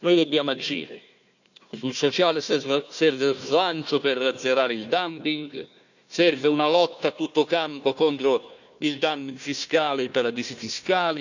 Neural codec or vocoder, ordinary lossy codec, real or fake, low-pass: codec, 16 kHz, 1 kbps, FunCodec, trained on Chinese and English, 50 frames a second; none; fake; 7.2 kHz